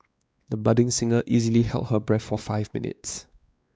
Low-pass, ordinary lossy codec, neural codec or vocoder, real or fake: none; none; codec, 16 kHz, 2 kbps, X-Codec, WavLM features, trained on Multilingual LibriSpeech; fake